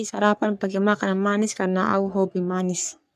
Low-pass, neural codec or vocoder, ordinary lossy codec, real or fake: 14.4 kHz; codec, 44.1 kHz, 3.4 kbps, Pupu-Codec; none; fake